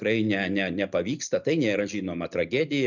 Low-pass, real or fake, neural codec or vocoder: 7.2 kHz; real; none